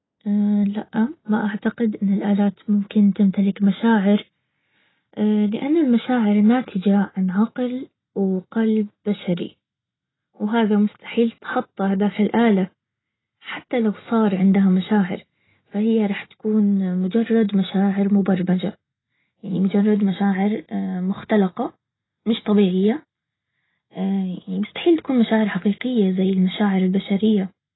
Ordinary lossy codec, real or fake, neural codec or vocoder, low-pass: AAC, 16 kbps; real; none; 7.2 kHz